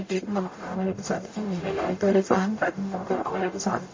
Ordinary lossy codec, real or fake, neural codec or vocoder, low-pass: MP3, 32 kbps; fake; codec, 44.1 kHz, 0.9 kbps, DAC; 7.2 kHz